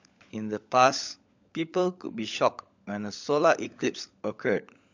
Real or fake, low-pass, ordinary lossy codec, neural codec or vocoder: fake; 7.2 kHz; MP3, 64 kbps; codec, 16 kHz, 16 kbps, FunCodec, trained on LibriTTS, 50 frames a second